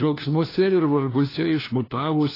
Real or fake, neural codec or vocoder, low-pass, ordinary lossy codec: fake; codec, 16 kHz, 1 kbps, FunCodec, trained on LibriTTS, 50 frames a second; 5.4 kHz; AAC, 24 kbps